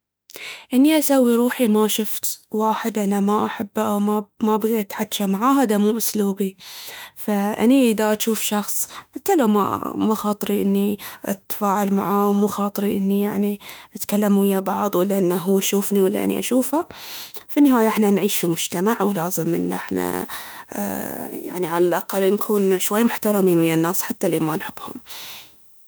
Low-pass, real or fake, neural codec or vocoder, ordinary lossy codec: none; fake; autoencoder, 48 kHz, 32 numbers a frame, DAC-VAE, trained on Japanese speech; none